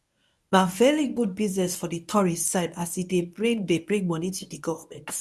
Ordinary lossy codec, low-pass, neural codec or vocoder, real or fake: none; none; codec, 24 kHz, 0.9 kbps, WavTokenizer, medium speech release version 1; fake